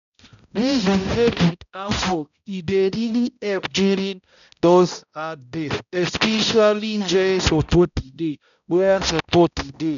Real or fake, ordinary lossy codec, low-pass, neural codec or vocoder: fake; none; 7.2 kHz; codec, 16 kHz, 0.5 kbps, X-Codec, HuBERT features, trained on balanced general audio